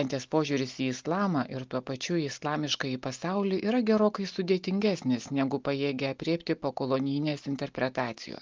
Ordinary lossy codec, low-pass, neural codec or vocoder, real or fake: Opus, 32 kbps; 7.2 kHz; none; real